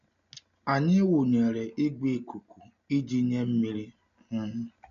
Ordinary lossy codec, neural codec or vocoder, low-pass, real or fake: none; none; 7.2 kHz; real